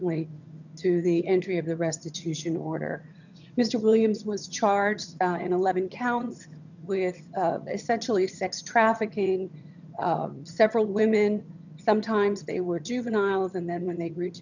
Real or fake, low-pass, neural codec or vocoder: fake; 7.2 kHz; vocoder, 22.05 kHz, 80 mel bands, HiFi-GAN